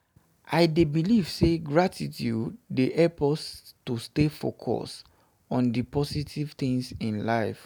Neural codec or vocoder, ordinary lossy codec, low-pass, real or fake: none; none; none; real